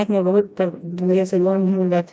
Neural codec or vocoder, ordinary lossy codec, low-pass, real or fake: codec, 16 kHz, 1 kbps, FreqCodec, smaller model; none; none; fake